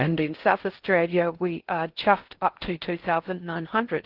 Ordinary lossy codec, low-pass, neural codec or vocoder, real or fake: Opus, 16 kbps; 5.4 kHz; codec, 16 kHz in and 24 kHz out, 0.6 kbps, FocalCodec, streaming, 2048 codes; fake